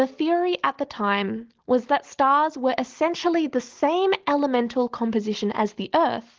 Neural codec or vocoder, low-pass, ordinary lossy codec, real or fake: none; 7.2 kHz; Opus, 16 kbps; real